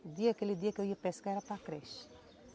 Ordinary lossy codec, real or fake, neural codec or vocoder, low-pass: none; real; none; none